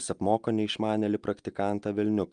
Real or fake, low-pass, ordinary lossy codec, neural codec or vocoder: real; 9.9 kHz; Opus, 32 kbps; none